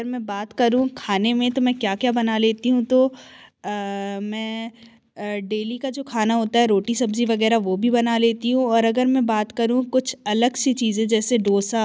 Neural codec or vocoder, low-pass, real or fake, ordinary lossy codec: none; none; real; none